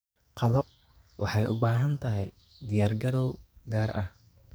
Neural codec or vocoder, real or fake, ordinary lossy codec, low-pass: codec, 44.1 kHz, 2.6 kbps, SNAC; fake; none; none